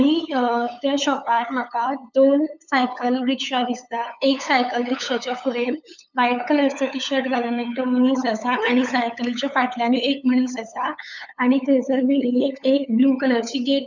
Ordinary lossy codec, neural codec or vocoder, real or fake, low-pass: none; codec, 16 kHz, 8 kbps, FunCodec, trained on LibriTTS, 25 frames a second; fake; 7.2 kHz